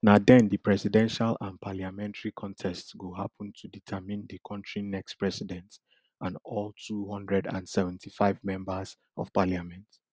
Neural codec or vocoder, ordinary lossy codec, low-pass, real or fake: none; none; none; real